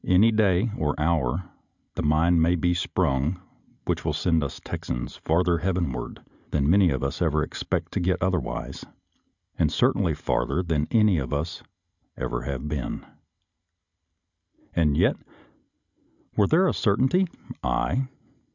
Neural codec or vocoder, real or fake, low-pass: none; real; 7.2 kHz